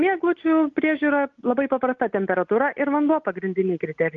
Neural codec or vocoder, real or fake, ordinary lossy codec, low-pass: none; real; Opus, 32 kbps; 7.2 kHz